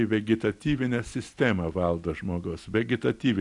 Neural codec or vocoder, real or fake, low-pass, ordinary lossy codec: none; real; 10.8 kHz; AAC, 64 kbps